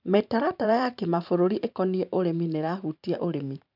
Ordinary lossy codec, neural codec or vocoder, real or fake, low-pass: none; vocoder, 44.1 kHz, 128 mel bands every 512 samples, BigVGAN v2; fake; 5.4 kHz